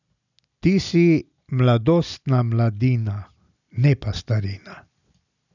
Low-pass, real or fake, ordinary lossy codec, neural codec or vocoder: 7.2 kHz; real; none; none